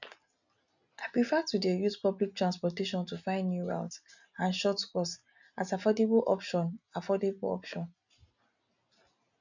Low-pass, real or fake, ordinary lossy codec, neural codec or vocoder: 7.2 kHz; real; none; none